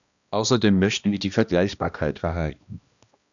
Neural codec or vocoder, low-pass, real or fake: codec, 16 kHz, 1 kbps, X-Codec, HuBERT features, trained on balanced general audio; 7.2 kHz; fake